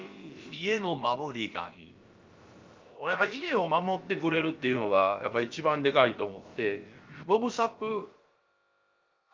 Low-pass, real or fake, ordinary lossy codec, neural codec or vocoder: 7.2 kHz; fake; Opus, 24 kbps; codec, 16 kHz, about 1 kbps, DyCAST, with the encoder's durations